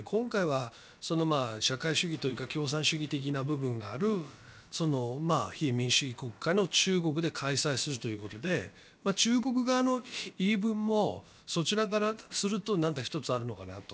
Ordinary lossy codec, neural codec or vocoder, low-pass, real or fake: none; codec, 16 kHz, about 1 kbps, DyCAST, with the encoder's durations; none; fake